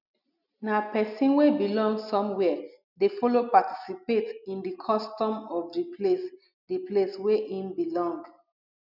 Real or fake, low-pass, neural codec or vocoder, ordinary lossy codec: real; 5.4 kHz; none; none